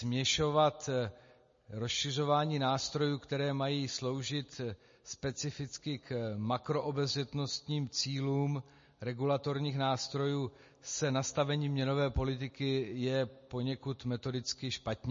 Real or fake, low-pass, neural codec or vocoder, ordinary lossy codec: real; 7.2 kHz; none; MP3, 32 kbps